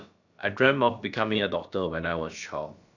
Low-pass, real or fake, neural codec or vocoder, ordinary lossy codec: 7.2 kHz; fake; codec, 16 kHz, about 1 kbps, DyCAST, with the encoder's durations; none